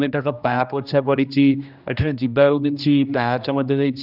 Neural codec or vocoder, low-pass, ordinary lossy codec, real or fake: codec, 16 kHz, 1 kbps, X-Codec, HuBERT features, trained on general audio; 5.4 kHz; none; fake